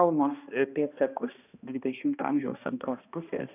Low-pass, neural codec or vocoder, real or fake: 3.6 kHz; codec, 16 kHz, 1 kbps, X-Codec, HuBERT features, trained on balanced general audio; fake